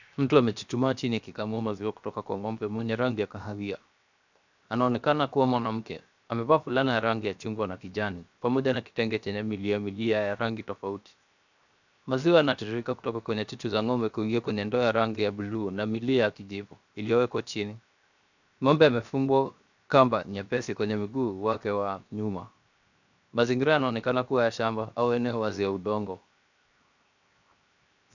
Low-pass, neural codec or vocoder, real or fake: 7.2 kHz; codec, 16 kHz, 0.7 kbps, FocalCodec; fake